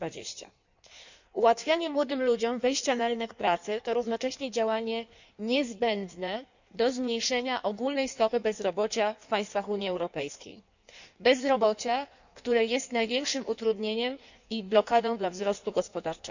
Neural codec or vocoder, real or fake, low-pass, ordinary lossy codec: codec, 16 kHz in and 24 kHz out, 1.1 kbps, FireRedTTS-2 codec; fake; 7.2 kHz; none